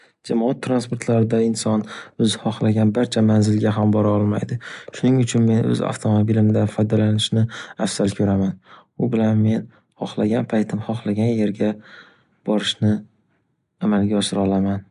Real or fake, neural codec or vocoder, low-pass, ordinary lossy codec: real; none; 10.8 kHz; none